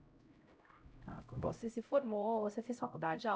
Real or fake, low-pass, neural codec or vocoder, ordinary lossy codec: fake; none; codec, 16 kHz, 0.5 kbps, X-Codec, HuBERT features, trained on LibriSpeech; none